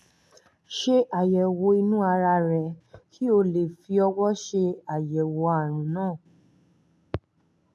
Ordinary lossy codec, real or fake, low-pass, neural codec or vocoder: none; real; none; none